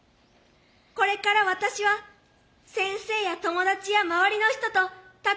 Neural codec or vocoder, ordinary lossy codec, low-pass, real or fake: none; none; none; real